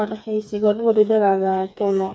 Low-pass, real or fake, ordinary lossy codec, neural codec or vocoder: none; fake; none; codec, 16 kHz, 4 kbps, FreqCodec, smaller model